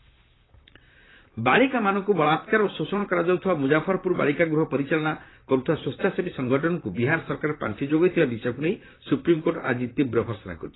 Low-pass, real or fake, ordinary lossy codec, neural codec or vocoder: 7.2 kHz; fake; AAC, 16 kbps; vocoder, 44.1 kHz, 128 mel bands, Pupu-Vocoder